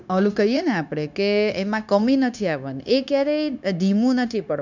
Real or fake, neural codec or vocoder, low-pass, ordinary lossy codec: fake; codec, 16 kHz, 0.9 kbps, LongCat-Audio-Codec; 7.2 kHz; none